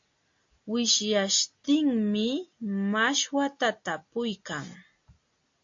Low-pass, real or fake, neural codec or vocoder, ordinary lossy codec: 7.2 kHz; real; none; AAC, 48 kbps